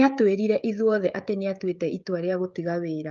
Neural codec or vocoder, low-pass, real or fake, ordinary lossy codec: codec, 16 kHz, 16 kbps, FreqCodec, smaller model; 7.2 kHz; fake; Opus, 24 kbps